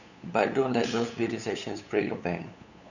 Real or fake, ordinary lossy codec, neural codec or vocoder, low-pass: fake; none; codec, 16 kHz, 8 kbps, FunCodec, trained on LibriTTS, 25 frames a second; 7.2 kHz